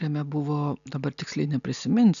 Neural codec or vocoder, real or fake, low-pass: none; real; 7.2 kHz